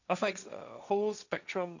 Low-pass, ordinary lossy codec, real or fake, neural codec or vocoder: 7.2 kHz; none; fake; codec, 16 kHz, 1.1 kbps, Voila-Tokenizer